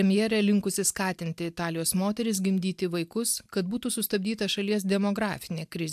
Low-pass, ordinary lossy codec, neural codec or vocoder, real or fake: 14.4 kHz; AAC, 96 kbps; none; real